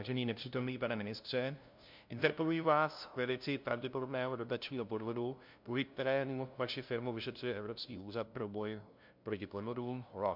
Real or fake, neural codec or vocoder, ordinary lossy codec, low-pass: fake; codec, 16 kHz, 0.5 kbps, FunCodec, trained on LibriTTS, 25 frames a second; MP3, 48 kbps; 5.4 kHz